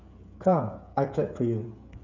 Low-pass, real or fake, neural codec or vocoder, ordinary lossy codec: 7.2 kHz; fake; codec, 16 kHz, 8 kbps, FreqCodec, smaller model; none